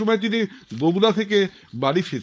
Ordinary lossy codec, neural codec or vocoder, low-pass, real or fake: none; codec, 16 kHz, 4.8 kbps, FACodec; none; fake